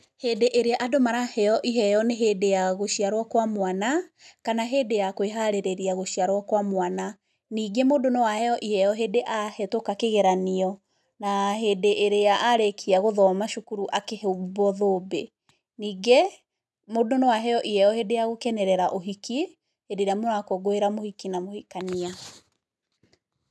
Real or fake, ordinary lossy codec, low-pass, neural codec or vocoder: real; none; none; none